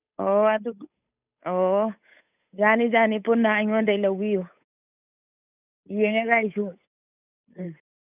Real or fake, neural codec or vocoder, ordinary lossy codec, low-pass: fake; codec, 16 kHz, 8 kbps, FunCodec, trained on Chinese and English, 25 frames a second; none; 3.6 kHz